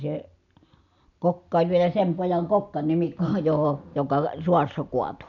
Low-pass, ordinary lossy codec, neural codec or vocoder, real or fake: 7.2 kHz; none; none; real